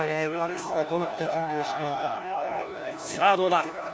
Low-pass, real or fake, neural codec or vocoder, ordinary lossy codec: none; fake; codec, 16 kHz, 1 kbps, FunCodec, trained on LibriTTS, 50 frames a second; none